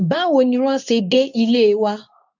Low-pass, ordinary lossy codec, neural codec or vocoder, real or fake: 7.2 kHz; none; codec, 24 kHz, 0.9 kbps, WavTokenizer, medium speech release version 2; fake